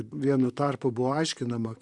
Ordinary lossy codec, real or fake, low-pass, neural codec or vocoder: Opus, 64 kbps; fake; 10.8 kHz; vocoder, 44.1 kHz, 128 mel bands, Pupu-Vocoder